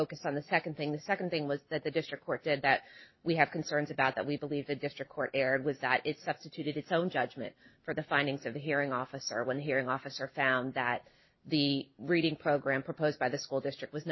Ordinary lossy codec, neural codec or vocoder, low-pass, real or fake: MP3, 24 kbps; none; 7.2 kHz; real